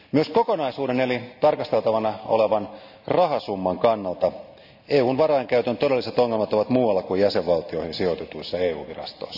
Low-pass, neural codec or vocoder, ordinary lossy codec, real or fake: 5.4 kHz; none; none; real